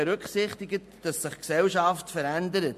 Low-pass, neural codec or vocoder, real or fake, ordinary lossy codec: 14.4 kHz; none; real; MP3, 64 kbps